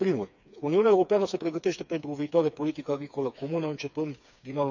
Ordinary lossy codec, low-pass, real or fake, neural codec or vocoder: none; 7.2 kHz; fake; codec, 16 kHz, 4 kbps, FreqCodec, smaller model